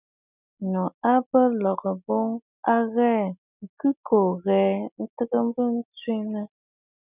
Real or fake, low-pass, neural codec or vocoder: real; 3.6 kHz; none